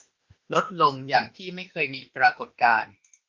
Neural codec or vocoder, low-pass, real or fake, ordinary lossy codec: autoencoder, 48 kHz, 32 numbers a frame, DAC-VAE, trained on Japanese speech; 7.2 kHz; fake; Opus, 32 kbps